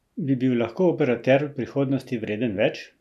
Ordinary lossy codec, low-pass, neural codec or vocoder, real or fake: none; 14.4 kHz; none; real